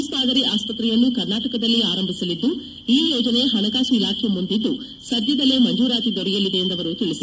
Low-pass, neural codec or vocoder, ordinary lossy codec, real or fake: none; none; none; real